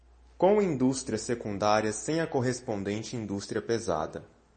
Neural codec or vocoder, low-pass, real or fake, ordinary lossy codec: none; 10.8 kHz; real; MP3, 32 kbps